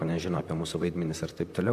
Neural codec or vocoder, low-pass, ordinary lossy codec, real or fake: vocoder, 44.1 kHz, 128 mel bands, Pupu-Vocoder; 14.4 kHz; AAC, 96 kbps; fake